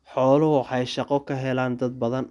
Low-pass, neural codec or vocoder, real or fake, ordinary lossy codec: 10.8 kHz; none; real; none